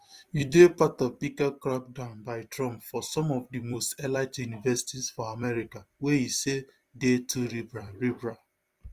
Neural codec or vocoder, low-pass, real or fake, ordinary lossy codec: vocoder, 44.1 kHz, 128 mel bands every 256 samples, BigVGAN v2; 14.4 kHz; fake; Opus, 32 kbps